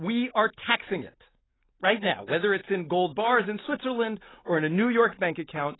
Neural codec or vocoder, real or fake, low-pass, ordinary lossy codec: none; real; 7.2 kHz; AAC, 16 kbps